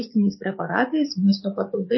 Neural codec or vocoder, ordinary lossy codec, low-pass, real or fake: codec, 44.1 kHz, 7.8 kbps, DAC; MP3, 24 kbps; 7.2 kHz; fake